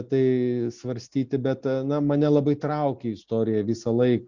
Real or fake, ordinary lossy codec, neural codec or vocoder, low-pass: real; Opus, 64 kbps; none; 7.2 kHz